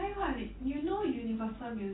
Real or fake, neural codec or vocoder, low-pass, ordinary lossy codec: real; none; 7.2 kHz; AAC, 16 kbps